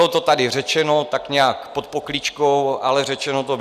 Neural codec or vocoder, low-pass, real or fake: none; 14.4 kHz; real